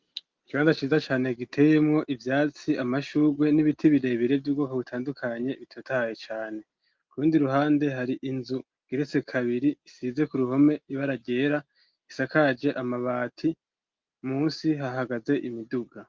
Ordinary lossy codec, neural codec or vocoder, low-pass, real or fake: Opus, 32 kbps; none; 7.2 kHz; real